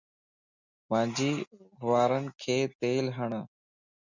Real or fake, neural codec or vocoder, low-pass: real; none; 7.2 kHz